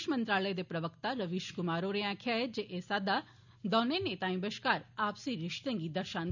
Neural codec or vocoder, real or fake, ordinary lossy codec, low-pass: none; real; none; 7.2 kHz